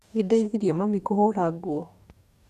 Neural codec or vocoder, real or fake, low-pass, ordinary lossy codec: codec, 44.1 kHz, 2.6 kbps, DAC; fake; 14.4 kHz; none